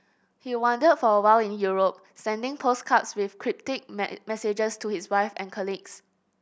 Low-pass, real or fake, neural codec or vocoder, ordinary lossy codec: none; real; none; none